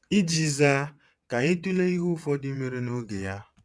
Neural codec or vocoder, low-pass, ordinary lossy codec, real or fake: vocoder, 22.05 kHz, 80 mel bands, WaveNeXt; none; none; fake